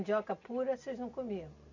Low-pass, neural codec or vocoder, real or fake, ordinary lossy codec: 7.2 kHz; none; real; none